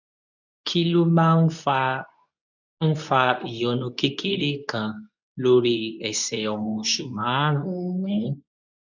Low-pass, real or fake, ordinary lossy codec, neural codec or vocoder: 7.2 kHz; fake; none; codec, 24 kHz, 0.9 kbps, WavTokenizer, medium speech release version 2